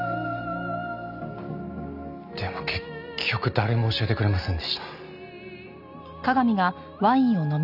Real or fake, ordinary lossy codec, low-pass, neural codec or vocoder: real; none; 5.4 kHz; none